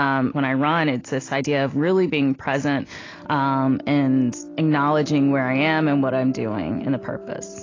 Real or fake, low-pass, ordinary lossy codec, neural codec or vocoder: real; 7.2 kHz; AAC, 32 kbps; none